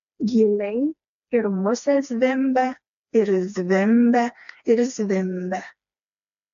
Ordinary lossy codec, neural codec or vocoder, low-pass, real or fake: AAC, 64 kbps; codec, 16 kHz, 2 kbps, FreqCodec, smaller model; 7.2 kHz; fake